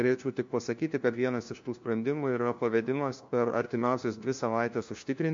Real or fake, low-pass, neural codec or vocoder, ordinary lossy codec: fake; 7.2 kHz; codec, 16 kHz, 1 kbps, FunCodec, trained on LibriTTS, 50 frames a second; MP3, 48 kbps